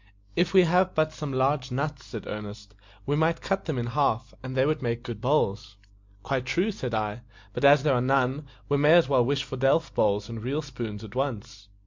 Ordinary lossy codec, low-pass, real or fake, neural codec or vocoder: MP3, 64 kbps; 7.2 kHz; real; none